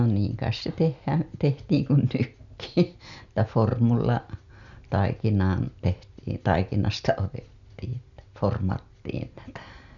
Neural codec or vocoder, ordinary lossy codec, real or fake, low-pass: none; none; real; 7.2 kHz